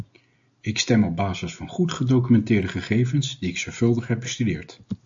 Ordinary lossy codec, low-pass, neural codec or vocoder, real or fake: AAC, 64 kbps; 7.2 kHz; none; real